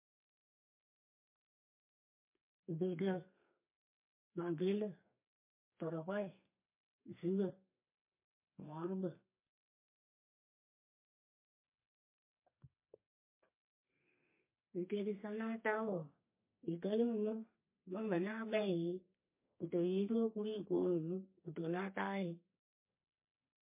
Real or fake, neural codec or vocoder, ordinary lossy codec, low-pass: fake; codec, 32 kHz, 1.9 kbps, SNAC; MP3, 24 kbps; 3.6 kHz